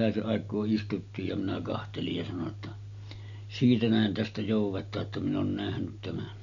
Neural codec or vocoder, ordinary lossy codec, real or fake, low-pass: none; none; real; 7.2 kHz